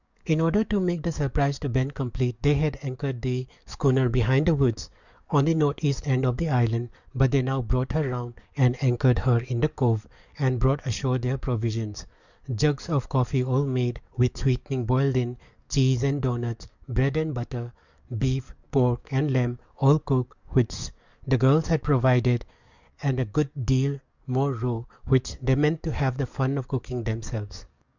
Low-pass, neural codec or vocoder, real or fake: 7.2 kHz; codec, 44.1 kHz, 7.8 kbps, DAC; fake